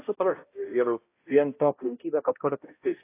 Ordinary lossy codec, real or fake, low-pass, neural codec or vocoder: AAC, 24 kbps; fake; 3.6 kHz; codec, 16 kHz, 0.5 kbps, X-Codec, HuBERT features, trained on balanced general audio